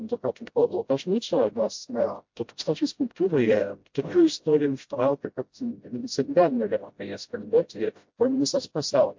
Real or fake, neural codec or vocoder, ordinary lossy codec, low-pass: fake; codec, 16 kHz, 0.5 kbps, FreqCodec, smaller model; MP3, 48 kbps; 7.2 kHz